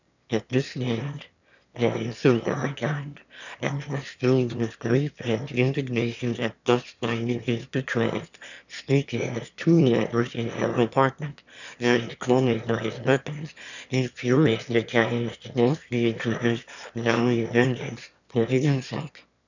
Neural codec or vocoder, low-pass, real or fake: autoencoder, 22.05 kHz, a latent of 192 numbers a frame, VITS, trained on one speaker; 7.2 kHz; fake